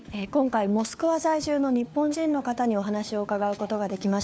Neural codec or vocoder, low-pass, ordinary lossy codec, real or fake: codec, 16 kHz, 4 kbps, FunCodec, trained on LibriTTS, 50 frames a second; none; none; fake